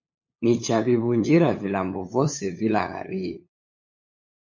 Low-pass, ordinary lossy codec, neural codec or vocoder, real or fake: 7.2 kHz; MP3, 32 kbps; codec, 16 kHz, 8 kbps, FunCodec, trained on LibriTTS, 25 frames a second; fake